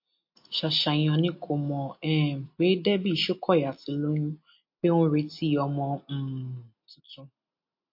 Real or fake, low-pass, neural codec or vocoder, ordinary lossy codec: real; 5.4 kHz; none; MP3, 32 kbps